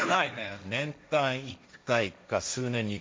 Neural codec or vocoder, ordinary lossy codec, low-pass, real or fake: codec, 16 kHz, 1.1 kbps, Voila-Tokenizer; none; none; fake